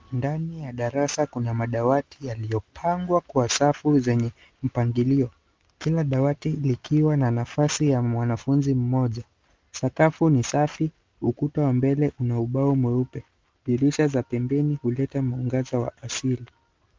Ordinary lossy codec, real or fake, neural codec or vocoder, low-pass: Opus, 32 kbps; real; none; 7.2 kHz